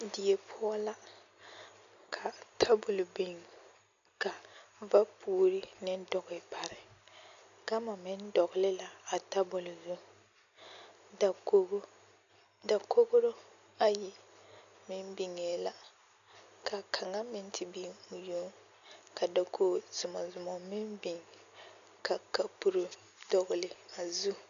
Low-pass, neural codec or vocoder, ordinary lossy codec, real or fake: 7.2 kHz; none; AAC, 96 kbps; real